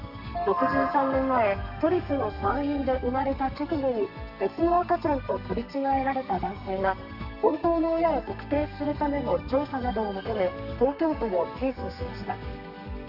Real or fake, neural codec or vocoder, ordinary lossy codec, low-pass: fake; codec, 32 kHz, 1.9 kbps, SNAC; none; 5.4 kHz